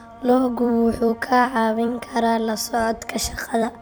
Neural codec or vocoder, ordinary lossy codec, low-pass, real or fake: vocoder, 44.1 kHz, 128 mel bands every 256 samples, BigVGAN v2; none; none; fake